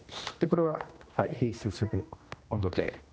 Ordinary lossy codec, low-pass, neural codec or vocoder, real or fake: none; none; codec, 16 kHz, 1 kbps, X-Codec, HuBERT features, trained on general audio; fake